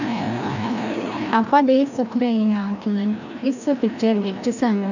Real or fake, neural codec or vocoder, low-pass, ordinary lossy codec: fake; codec, 16 kHz, 1 kbps, FreqCodec, larger model; 7.2 kHz; none